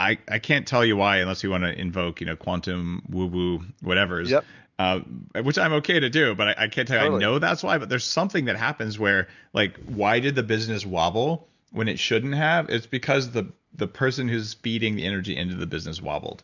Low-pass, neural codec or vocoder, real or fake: 7.2 kHz; none; real